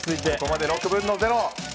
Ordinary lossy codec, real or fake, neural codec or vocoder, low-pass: none; real; none; none